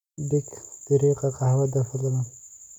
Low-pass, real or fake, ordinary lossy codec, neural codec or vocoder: 19.8 kHz; real; none; none